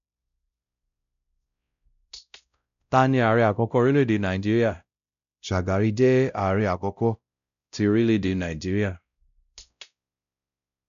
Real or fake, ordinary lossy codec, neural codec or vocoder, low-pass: fake; none; codec, 16 kHz, 0.5 kbps, X-Codec, WavLM features, trained on Multilingual LibriSpeech; 7.2 kHz